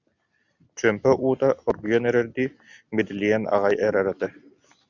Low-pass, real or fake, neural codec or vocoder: 7.2 kHz; real; none